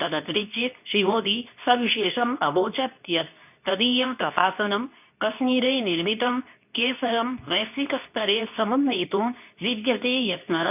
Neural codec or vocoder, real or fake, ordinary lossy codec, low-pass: codec, 24 kHz, 0.9 kbps, WavTokenizer, medium speech release version 2; fake; none; 3.6 kHz